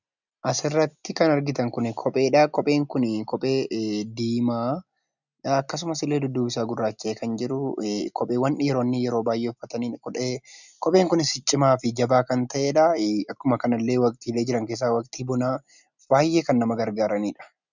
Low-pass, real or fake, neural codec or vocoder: 7.2 kHz; real; none